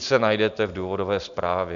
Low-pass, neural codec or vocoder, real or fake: 7.2 kHz; none; real